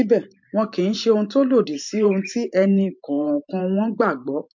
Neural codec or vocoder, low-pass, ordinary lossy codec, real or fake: vocoder, 44.1 kHz, 128 mel bands every 512 samples, BigVGAN v2; 7.2 kHz; MP3, 48 kbps; fake